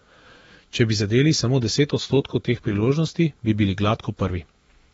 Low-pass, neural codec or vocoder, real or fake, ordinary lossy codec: 19.8 kHz; autoencoder, 48 kHz, 128 numbers a frame, DAC-VAE, trained on Japanese speech; fake; AAC, 24 kbps